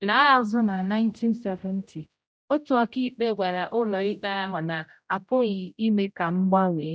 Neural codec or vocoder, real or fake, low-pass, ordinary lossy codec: codec, 16 kHz, 0.5 kbps, X-Codec, HuBERT features, trained on general audio; fake; none; none